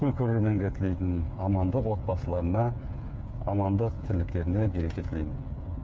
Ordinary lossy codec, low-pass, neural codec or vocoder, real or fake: none; none; codec, 16 kHz, 8 kbps, FreqCodec, smaller model; fake